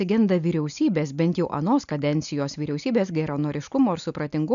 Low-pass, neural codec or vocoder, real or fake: 7.2 kHz; none; real